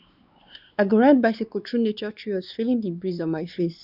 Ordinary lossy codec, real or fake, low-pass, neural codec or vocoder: none; fake; 5.4 kHz; codec, 16 kHz, 2 kbps, X-Codec, WavLM features, trained on Multilingual LibriSpeech